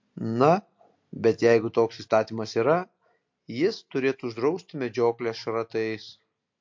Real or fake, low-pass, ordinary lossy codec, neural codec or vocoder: real; 7.2 kHz; MP3, 48 kbps; none